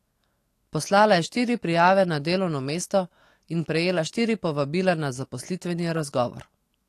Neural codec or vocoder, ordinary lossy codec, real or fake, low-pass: autoencoder, 48 kHz, 128 numbers a frame, DAC-VAE, trained on Japanese speech; AAC, 48 kbps; fake; 14.4 kHz